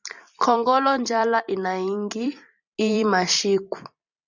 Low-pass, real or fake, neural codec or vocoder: 7.2 kHz; fake; vocoder, 44.1 kHz, 128 mel bands every 256 samples, BigVGAN v2